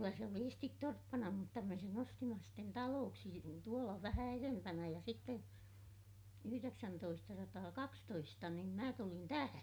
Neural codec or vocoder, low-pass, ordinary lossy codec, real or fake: none; none; none; real